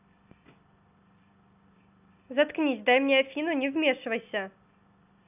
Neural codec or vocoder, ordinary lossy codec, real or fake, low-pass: none; none; real; 3.6 kHz